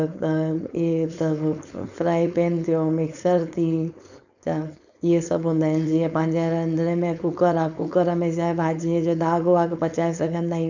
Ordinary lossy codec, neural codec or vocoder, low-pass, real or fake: none; codec, 16 kHz, 4.8 kbps, FACodec; 7.2 kHz; fake